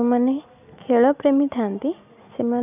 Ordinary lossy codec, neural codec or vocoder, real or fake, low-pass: none; none; real; 3.6 kHz